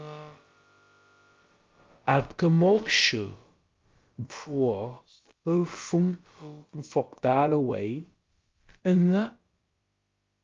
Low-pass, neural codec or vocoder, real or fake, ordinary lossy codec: 7.2 kHz; codec, 16 kHz, about 1 kbps, DyCAST, with the encoder's durations; fake; Opus, 32 kbps